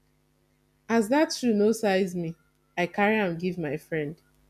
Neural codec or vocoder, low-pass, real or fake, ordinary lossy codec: none; 14.4 kHz; real; none